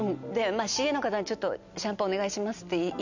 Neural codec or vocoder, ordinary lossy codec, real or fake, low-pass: none; none; real; 7.2 kHz